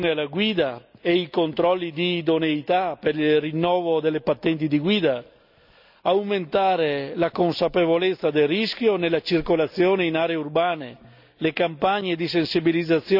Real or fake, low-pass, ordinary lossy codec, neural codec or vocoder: real; 5.4 kHz; none; none